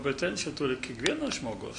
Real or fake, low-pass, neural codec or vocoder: real; 9.9 kHz; none